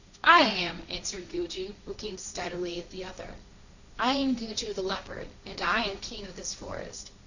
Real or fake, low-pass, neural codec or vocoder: fake; 7.2 kHz; codec, 16 kHz, 1.1 kbps, Voila-Tokenizer